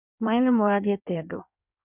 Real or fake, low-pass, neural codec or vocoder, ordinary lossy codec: fake; 3.6 kHz; codec, 16 kHz in and 24 kHz out, 1.1 kbps, FireRedTTS-2 codec; none